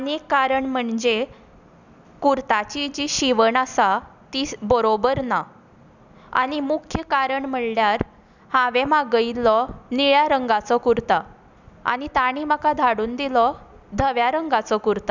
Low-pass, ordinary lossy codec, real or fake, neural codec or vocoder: 7.2 kHz; none; real; none